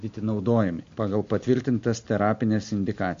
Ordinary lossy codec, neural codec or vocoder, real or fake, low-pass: MP3, 48 kbps; none; real; 7.2 kHz